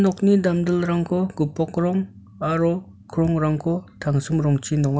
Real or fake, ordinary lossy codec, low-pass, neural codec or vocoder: real; none; none; none